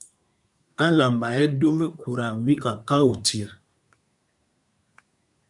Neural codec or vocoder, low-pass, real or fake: codec, 24 kHz, 1 kbps, SNAC; 10.8 kHz; fake